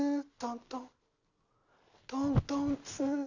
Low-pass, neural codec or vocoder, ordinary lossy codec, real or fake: 7.2 kHz; none; none; real